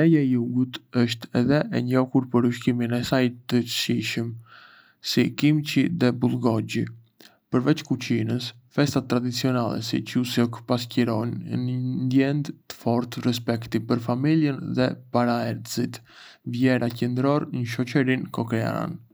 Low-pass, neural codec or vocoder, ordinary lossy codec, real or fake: none; none; none; real